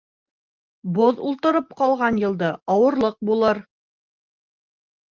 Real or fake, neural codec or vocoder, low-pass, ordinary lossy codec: real; none; 7.2 kHz; Opus, 24 kbps